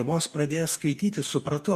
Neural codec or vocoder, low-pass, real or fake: codec, 44.1 kHz, 2.6 kbps, DAC; 14.4 kHz; fake